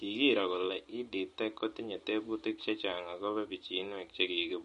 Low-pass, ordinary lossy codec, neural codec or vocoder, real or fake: 10.8 kHz; MP3, 48 kbps; none; real